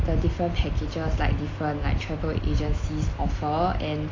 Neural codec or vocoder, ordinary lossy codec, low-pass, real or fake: none; AAC, 32 kbps; 7.2 kHz; real